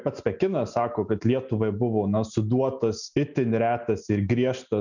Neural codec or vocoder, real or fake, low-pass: none; real; 7.2 kHz